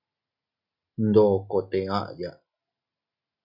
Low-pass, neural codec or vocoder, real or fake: 5.4 kHz; none; real